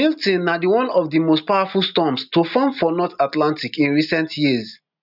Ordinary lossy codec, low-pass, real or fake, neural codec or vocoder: none; 5.4 kHz; real; none